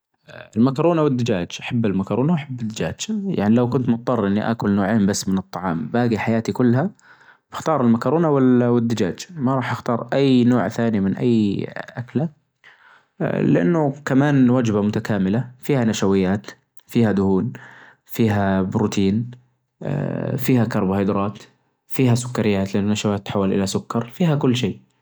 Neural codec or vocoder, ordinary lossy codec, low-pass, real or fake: none; none; none; real